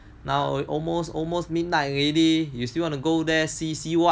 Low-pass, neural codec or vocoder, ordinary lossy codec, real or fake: none; none; none; real